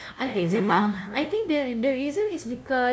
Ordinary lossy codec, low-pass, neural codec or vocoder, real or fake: none; none; codec, 16 kHz, 0.5 kbps, FunCodec, trained on LibriTTS, 25 frames a second; fake